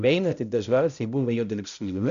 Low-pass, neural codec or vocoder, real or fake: 7.2 kHz; codec, 16 kHz, 0.5 kbps, X-Codec, HuBERT features, trained on balanced general audio; fake